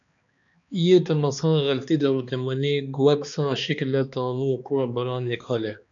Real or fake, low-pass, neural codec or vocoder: fake; 7.2 kHz; codec, 16 kHz, 2 kbps, X-Codec, HuBERT features, trained on balanced general audio